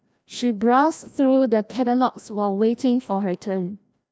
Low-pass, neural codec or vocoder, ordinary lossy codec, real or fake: none; codec, 16 kHz, 1 kbps, FreqCodec, larger model; none; fake